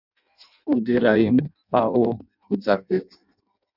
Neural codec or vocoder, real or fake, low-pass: codec, 16 kHz in and 24 kHz out, 0.6 kbps, FireRedTTS-2 codec; fake; 5.4 kHz